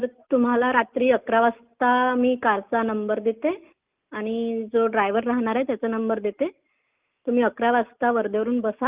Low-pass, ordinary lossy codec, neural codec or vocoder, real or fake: 3.6 kHz; Opus, 24 kbps; none; real